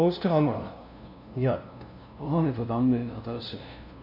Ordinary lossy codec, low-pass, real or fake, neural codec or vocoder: none; 5.4 kHz; fake; codec, 16 kHz, 0.5 kbps, FunCodec, trained on LibriTTS, 25 frames a second